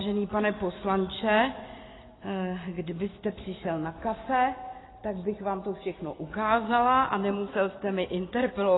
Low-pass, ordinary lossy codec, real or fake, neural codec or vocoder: 7.2 kHz; AAC, 16 kbps; real; none